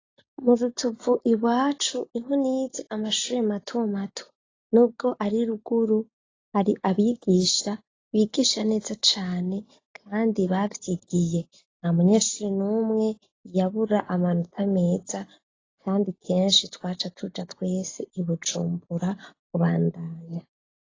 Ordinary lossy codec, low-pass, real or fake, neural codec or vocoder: AAC, 32 kbps; 7.2 kHz; real; none